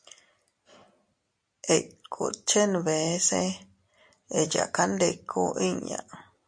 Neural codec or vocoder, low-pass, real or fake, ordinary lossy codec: none; 9.9 kHz; real; MP3, 48 kbps